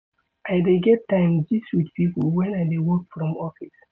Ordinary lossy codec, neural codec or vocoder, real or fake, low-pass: none; none; real; none